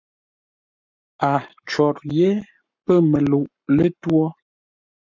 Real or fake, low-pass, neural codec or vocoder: fake; 7.2 kHz; codec, 44.1 kHz, 7.8 kbps, Pupu-Codec